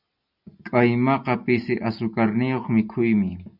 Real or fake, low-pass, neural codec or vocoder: real; 5.4 kHz; none